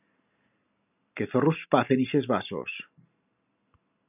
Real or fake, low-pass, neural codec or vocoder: real; 3.6 kHz; none